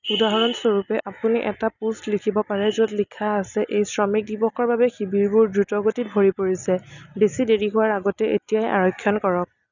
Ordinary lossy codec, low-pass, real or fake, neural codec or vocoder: none; 7.2 kHz; real; none